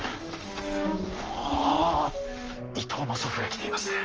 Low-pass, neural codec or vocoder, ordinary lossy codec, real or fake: 7.2 kHz; codec, 44.1 kHz, 7.8 kbps, Pupu-Codec; Opus, 24 kbps; fake